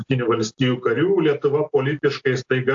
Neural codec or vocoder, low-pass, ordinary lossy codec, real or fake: none; 7.2 kHz; AAC, 64 kbps; real